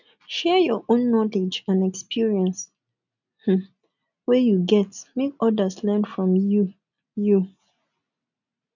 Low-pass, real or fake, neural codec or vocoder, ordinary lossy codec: 7.2 kHz; real; none; none